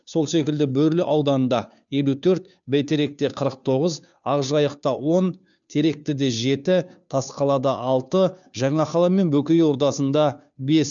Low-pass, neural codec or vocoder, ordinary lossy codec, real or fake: 7.2 kHz; codec, 16 kHz, 2 kbps, FunCodec, trained on Chinese and English, 25 frames a second; none; fake